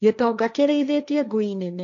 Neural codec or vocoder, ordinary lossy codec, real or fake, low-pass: codec, 16 kHz, 1.1 kbps, Voila-Tokenizer; none; fake; 7.2 kHz